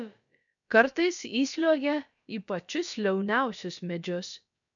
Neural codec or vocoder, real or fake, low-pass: codec, 16 kHz, about 1 kbps, DyCAST, with the encoder's durations; fake; 7.2 kHz